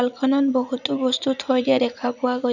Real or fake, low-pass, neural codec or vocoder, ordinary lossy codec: fake; 7.2 kHz; vocoder, 44.1 kHz, 80 mel bands, Vocos; none